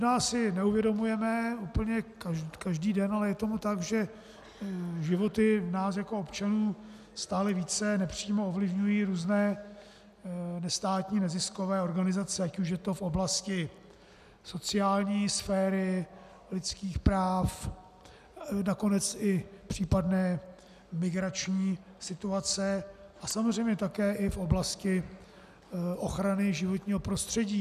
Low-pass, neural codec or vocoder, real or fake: 14.4 kHz; none; real